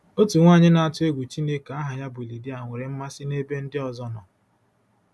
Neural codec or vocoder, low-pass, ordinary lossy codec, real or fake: none; none; none; real